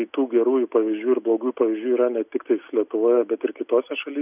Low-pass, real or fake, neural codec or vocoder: 3.6 kHz; real; none